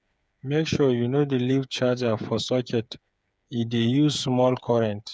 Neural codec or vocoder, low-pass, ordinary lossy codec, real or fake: codec, 16 kHz, 8 kbps, FreqCodec, smaller model; none; none; fake